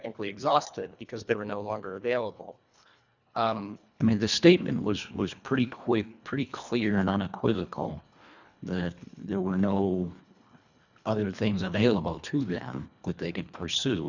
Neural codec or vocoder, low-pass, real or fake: codec, 24 kHz, 1.5 kbps, HILCodec; 7.2 kHz; fake